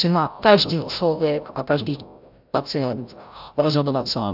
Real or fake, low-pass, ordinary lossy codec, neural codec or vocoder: fake; 5.4 kHz; AAC, 48 kbps; codec, 16 kHz, 0.5 kbps, FreqCodec, larger model